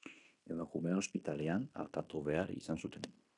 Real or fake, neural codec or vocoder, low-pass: fake; codec, 16 kHz in and 24 kHz out, 0.9 kbps, LongCat-Audio-Codec, fine tuned four codebook decoder; 10.8 kHz